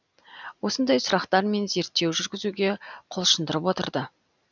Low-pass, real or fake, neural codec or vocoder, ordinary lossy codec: 7.2 kHz; real; none; none